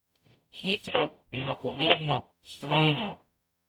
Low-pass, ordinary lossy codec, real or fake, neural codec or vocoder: 19.8 kHz; none; fake; codec, 44.1 kHz, 0.9 kbps, DAC